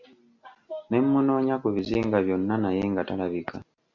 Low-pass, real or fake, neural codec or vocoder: 7.2 kHz; real; none